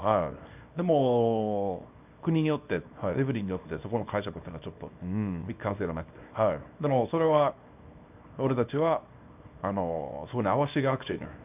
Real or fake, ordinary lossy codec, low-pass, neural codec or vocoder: fake; none; 3.6 kHz; codec, 24 kHz, 0.9 kbps, WavTokenizer, small release